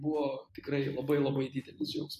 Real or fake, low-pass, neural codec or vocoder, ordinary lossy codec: real; 14.4 kHz; none; AAC, 96 kbps